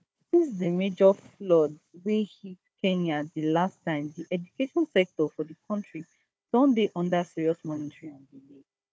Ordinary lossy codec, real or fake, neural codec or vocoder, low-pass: none; fake; codec, 16 kHz, 4 kbps, FunCodec, trained on Chinese and English, 50 frames a second; none